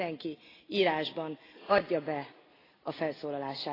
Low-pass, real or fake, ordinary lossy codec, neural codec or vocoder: 5.4 kHz; real; AAC, 24 kbps; none